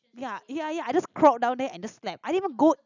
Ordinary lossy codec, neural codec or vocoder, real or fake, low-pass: none; none; real; 7.2 kHz